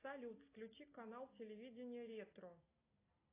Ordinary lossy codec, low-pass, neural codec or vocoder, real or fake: AAC, 24 kbps; 3.6 kHz; none; real